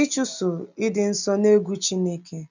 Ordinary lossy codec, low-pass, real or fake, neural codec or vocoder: none; 7.2 kHz; real; none